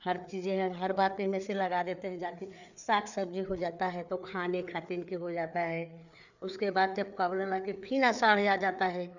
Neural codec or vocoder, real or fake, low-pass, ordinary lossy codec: codec, 16 kHz, 4 kbps, FreqCodec, larger model; fake; 7.2 kHz; none